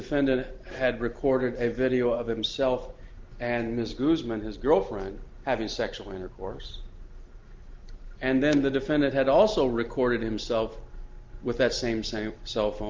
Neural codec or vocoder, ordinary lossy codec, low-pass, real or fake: none; Opus, 32 kbps; 7.2 kHz; real